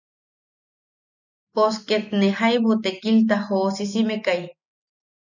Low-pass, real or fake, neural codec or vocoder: 7.2 kHz; real; none